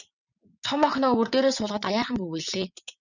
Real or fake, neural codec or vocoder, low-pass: fake; vocoder, 22.05 kHz, 80 mel bands, WaveNeXt; 7.2 kHz